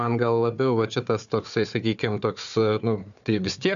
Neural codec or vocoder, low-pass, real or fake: codec, 16 kHz, 4 kbps, FunCodec, trained on Chinese and English, 50 frames a second; 7.2 kHz; fake